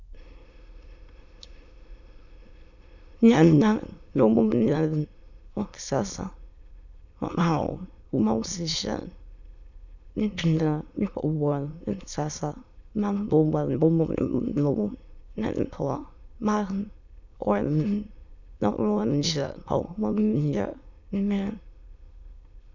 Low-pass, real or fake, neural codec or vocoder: 7.2 kHz; fake; autoencoder, 22.05 kHz, a latent of 192 numbers a frame, VITS, trained on many speakers